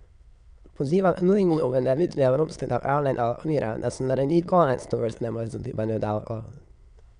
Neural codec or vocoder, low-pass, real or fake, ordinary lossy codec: autoencoder, 22.05 kHz, a latent of 192 numbers a frame, VITS, trained on many speakers; 9.9 kHz; fake; none